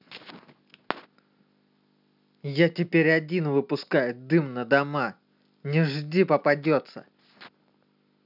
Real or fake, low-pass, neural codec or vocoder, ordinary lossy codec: real; 5.4 kHz; none; none